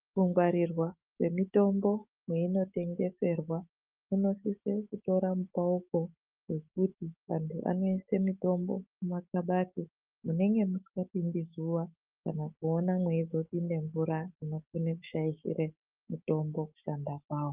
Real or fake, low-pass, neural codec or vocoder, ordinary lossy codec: fake; 3.6 kHz; autoencoder, 48 kHz, 128 numbers a frame, DAC-VAE, trained on Japanese speech; Opus, 24 kbps